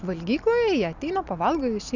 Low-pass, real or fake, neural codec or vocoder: 7.2 kHz; real; none